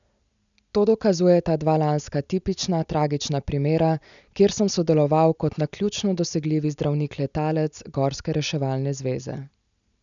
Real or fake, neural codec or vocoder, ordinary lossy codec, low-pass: real; none; none; 7.2 kHz